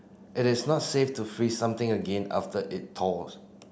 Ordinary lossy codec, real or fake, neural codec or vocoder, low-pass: none; real; none; none